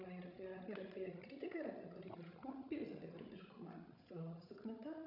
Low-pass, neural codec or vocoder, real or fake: 5.4 kHz; codec, 16 kHz, 16 kbps, FreqCodec, larger model; fake